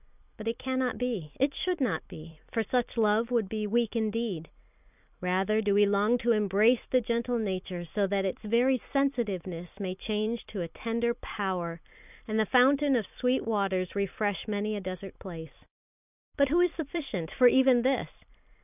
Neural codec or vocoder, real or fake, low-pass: vocoder, 44.1 kHz, 128 mel bands every 256 samples, BigVGAN v2; fake; 3.6 kHz